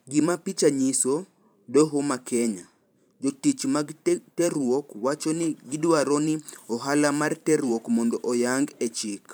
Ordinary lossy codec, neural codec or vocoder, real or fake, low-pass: none; vocoder, 44.1 kHz, 128 mel bands every 512 samples, BigVGAN v2; fake; none